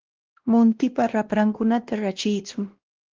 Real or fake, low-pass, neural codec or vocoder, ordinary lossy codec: fake; 7.2 kHz; codec, 16 kHz, 1 kbps, X-Codec, WavLM features, trained on Multilingual LibriSpeech; Opus, 16 kbps